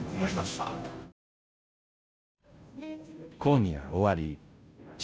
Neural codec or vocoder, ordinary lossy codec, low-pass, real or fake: codec, 16 kHz, 0.5 kbps, FunCodec, trained on Chinese and English, 25 frames a second; none; none; fake